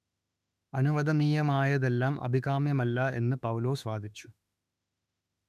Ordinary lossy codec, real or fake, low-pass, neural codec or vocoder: none; fake; 14.4 kHz; autoencoder, 48 kHz, 32 numbers a frame, DAC-VAE, trained on Japanese speech